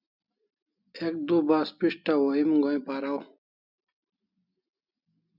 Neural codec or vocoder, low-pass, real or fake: none; 5.4 kHz; real